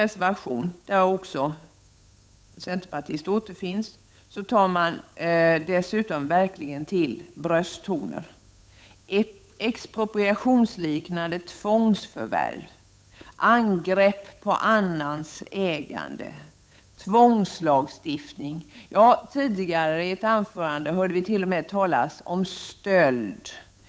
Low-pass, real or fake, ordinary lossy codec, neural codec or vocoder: none; fake; none; codec, 16 kHz, 8 kbps, FunCodec, trained on Chinese and English, 25 frames a second